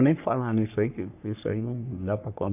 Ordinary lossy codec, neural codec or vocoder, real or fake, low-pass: none; codec, 44.1 kHz, 3.4 kbps, Pupu-Codec; fake; 3.6 kHz